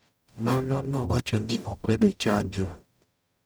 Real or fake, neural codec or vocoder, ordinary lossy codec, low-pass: fake; codec, 44.1 kHz, 0.9 kbps, DAC; none; none